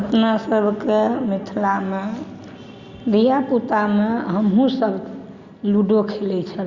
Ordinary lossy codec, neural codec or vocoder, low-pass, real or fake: Opus, 64 kbps; none; 7.2 kHz; real